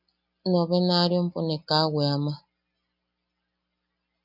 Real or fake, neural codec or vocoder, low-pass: real; none; 5.4 kHz